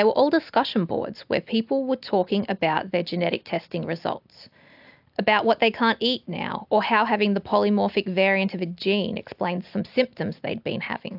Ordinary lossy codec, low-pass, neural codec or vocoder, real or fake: AAC, 48 kbps; 5.4 kHz; none; real